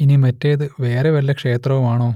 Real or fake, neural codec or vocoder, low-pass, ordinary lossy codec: real; none; 19.8 kHz; none